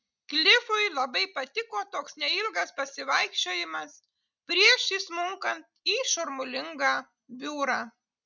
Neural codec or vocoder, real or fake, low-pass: none; real; 7.2 kHz